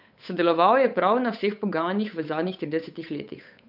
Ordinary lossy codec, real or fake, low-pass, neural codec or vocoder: none; fake; 5.4 kHz; codec, 16 kHz, 8 kbps, FunCodec, trained on Chinese and English, 25 frames a second